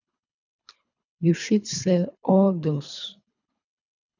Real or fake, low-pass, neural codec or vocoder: fake; 7.2 kHz; codec, 24 kHz, 3 kbps, HILCodec